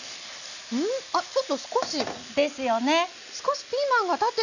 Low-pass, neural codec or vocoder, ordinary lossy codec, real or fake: 7.2 kHz; none; none; real